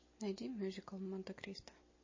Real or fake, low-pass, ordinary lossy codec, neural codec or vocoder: real; 7.2 kHz; MP3, 32 kbps; none